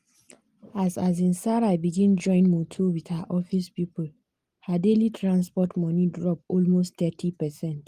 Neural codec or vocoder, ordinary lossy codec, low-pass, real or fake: none; Opus, 24 kbps; 14.4 kHz; real